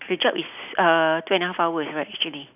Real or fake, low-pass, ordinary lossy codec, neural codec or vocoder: real; 3.6 kHz; none; none